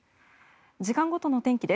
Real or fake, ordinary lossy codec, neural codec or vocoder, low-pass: real; none; none; none